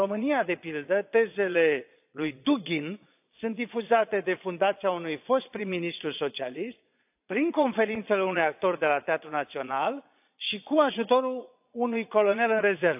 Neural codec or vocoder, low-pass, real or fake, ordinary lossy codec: vocoder, 22.05 kHz, 80 mel bands, Vocos; 3.6 kHz; fake; AAC, 32 kbps